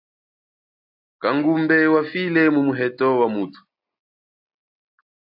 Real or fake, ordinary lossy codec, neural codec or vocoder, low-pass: real; AAC, 48 kbps; none; 5.4 kHz